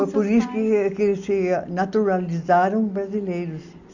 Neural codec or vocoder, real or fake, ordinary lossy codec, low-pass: none; real; none; 7.2 kHz